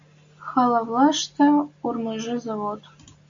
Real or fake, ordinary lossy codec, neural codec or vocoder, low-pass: real; MP3, 48 kbps; none; 7.2 kHz